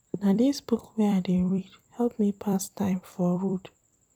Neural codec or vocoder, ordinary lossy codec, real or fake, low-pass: vocoder, 44.1 kHz, 128 mel bands every 256 samples, BigVGAN v2; none; fake; 19.8 kHz